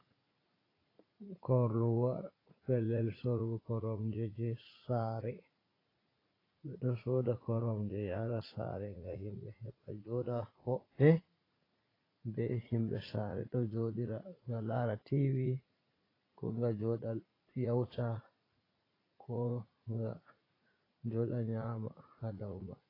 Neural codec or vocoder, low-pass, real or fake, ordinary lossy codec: vocoder, 44.1 kHz, 80 mel bands, Vocos; 5.4 kHz; fake; AAC, 24 kbps